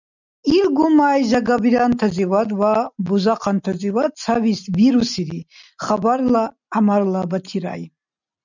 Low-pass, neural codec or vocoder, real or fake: 7.2 kHz; none; real